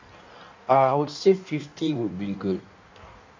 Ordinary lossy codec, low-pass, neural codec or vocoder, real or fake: MP3, 64 kbps; 7.2 kHz; codec, 16 kHz in and 24 kHz out, 1.1 kbps, FireRedTTS-2 codec; fake